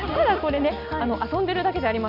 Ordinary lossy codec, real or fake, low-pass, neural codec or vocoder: none; real; 5.4 kHz; none